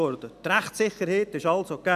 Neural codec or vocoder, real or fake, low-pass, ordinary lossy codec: none; real; 14.4 kHz; none